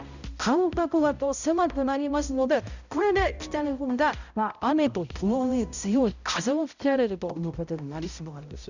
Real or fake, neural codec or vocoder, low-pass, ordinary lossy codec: fake; codec, 16 kHz, 0.5 kbps, X-Codec, HuBERT features, trained on balanced general audio; 7.2 kHz; none